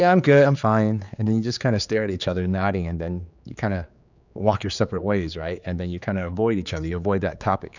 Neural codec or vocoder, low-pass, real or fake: codec, 16 kHz, 4 kbps, X-Codec, HuBERT features, trained on general audio; 7.2 kHz; fake